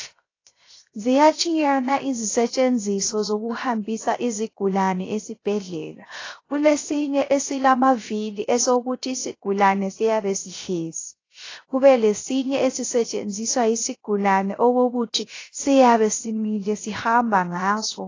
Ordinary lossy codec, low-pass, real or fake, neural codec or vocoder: AAC, 32 kbps; 7.2 kHz; fake; codec, 16 kHz, 0.3 kbps, FocalCodec